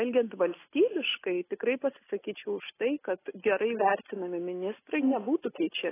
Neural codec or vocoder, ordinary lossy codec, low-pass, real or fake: none; AAC, 16 kbps; 3.6 kHz; real